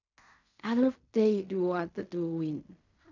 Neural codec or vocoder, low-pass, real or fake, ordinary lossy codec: codec, 16 kHz in and 24 kHz out, 0.4 kbps, LongCat-Audio-Codec, fine tuned four codebook decoder; 7.2 kHz; fake; none